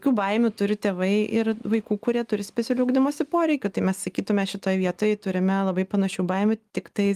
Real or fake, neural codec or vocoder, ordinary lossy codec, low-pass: real; none; Opus, 32 kbps; 14.4 kHz